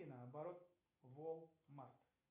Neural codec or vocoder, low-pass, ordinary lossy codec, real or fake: none; 3.6 kHz; AAC, 32 kbps; real